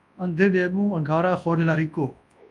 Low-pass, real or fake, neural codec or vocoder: 10.8 kHz; fake; codec, 24 kHz, 0.9 kbps, WavTokenizer, large speech release